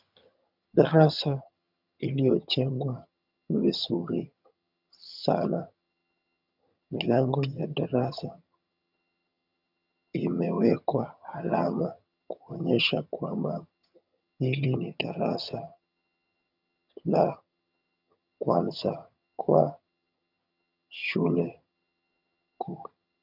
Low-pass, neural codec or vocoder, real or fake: 5.4 kHz; vocoder, 22.05 kHz, 80 mel bands, HiFi-GAN; fake